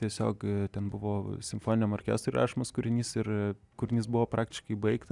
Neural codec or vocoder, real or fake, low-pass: none; real; 10.8 kHz